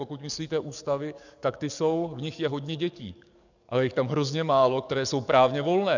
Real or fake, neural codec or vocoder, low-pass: fake; codec, 44.1 kHz, 7.8 kbps, DAC; 7.2 kHz